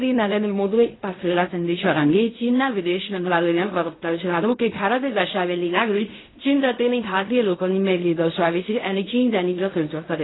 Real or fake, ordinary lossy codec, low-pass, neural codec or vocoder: fake; AAC, 16 kbps; 7.2 kHz; codec, 16 kHz in and 24 kHz out, 0.4 kbps, LongCat-Audio-Codec, fine tuned four codebook decoder